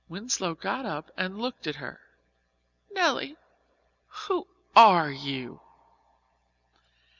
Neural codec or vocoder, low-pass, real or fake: none; 7.2 kHz; real